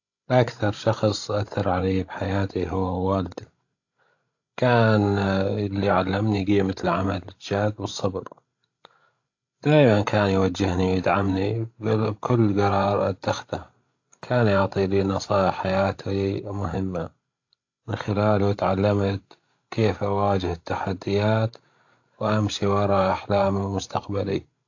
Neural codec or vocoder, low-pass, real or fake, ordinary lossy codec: codec, 16 kHz, 16 kbps, FreqCodec, larger model; 7.2 kHz; fake; AAC, 48 kbps